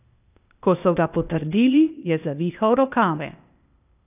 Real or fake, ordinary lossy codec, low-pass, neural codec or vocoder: fake; none; 3.6 kHz; codec, 16 kHz, 0.8 kbps, ZipCodec